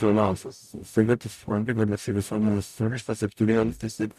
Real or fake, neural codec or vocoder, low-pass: fake; codec, 44.1 kHz, 0.9 kbps, DAC; 14.4 kHz